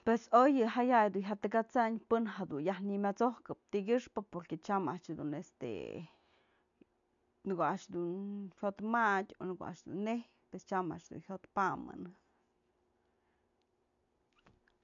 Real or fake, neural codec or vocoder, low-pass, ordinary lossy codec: real; none; 7.2 kHz; none